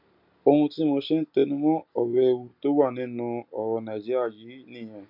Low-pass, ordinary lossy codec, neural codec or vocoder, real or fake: 5.4 kHz; none; none; real